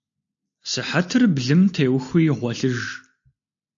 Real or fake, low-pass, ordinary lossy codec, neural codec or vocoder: real; 7.2 kHz; AAC, 64 kbps; none